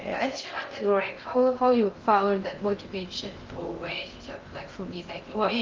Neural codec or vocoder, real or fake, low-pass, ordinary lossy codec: codec, 16 kHz in and 24 kHz out, 0.6 kbps, FocalCodec, streaming, 2048 codes; fake; 7.2 kHz; Opus, 32 kbps